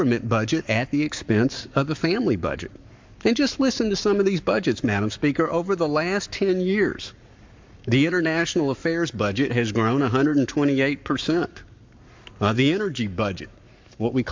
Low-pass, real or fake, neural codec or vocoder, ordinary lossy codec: 7.2 kHz; fake; codec, 44.1 kHz, 7.8 kbps, Pupu-Codec; MP3, 64 kbps